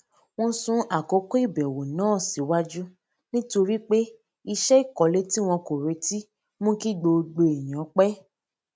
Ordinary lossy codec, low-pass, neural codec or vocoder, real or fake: none; none; none; real